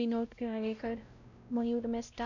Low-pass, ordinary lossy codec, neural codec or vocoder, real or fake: 7.2 kHz; none; codec, 16 kHz, 0.5 kbps, X-Codec, WavLM features, trained on Multilingual LibriSpeech; fake